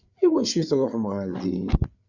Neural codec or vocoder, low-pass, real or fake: codec, 16 kHz, 8 kbps, FreqCodec, larger model; 7.2 kHz; fake